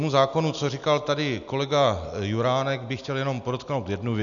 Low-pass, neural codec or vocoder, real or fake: 7.2 kHz; none; real